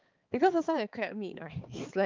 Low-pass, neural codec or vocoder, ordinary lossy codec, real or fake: 7.2 kHz; codec, 16 kHz, 4 kbps, X-Codec, HuBERT features, trained on balanced general audio; Opus, 24 kbps; fake